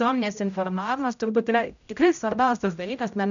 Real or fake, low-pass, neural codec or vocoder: fake; 7.2 kHz; codec, 16 kHz, 0.5 kbps, X-Codec, HuBERT features, trained on general audio